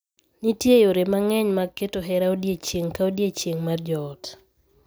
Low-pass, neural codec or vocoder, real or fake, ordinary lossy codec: none; none; real; none